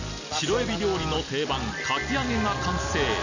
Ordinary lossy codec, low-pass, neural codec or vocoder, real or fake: none; 7.2 kHz; none; real